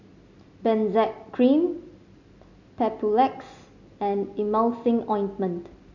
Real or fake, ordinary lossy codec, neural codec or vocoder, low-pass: real; none; none; 7.2 kHz